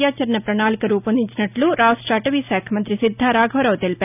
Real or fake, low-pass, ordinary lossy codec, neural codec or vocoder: real; 3.6 kHz; none; none